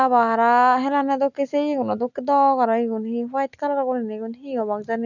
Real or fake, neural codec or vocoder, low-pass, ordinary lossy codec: real; none; 7.2 kHz; none